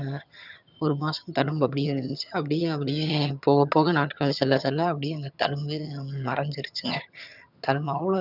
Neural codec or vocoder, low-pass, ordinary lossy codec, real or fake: vocoder, 22.05 kHz, 80 mel bands, HiFi-GAN; 5.4 kHz; none; fake